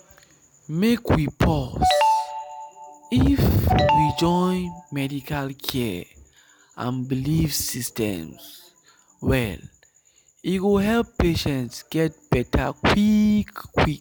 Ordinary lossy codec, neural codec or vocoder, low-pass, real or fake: none; none; none; real